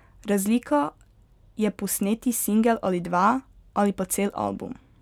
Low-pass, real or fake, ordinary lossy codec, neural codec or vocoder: 19.8 kHz; real; none; none